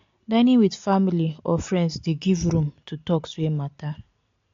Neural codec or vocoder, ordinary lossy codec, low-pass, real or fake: none; AAC, 48 kbps; 7.2 kHz; real